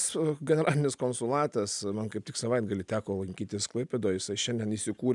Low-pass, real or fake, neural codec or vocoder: 10.8 kHz; real; none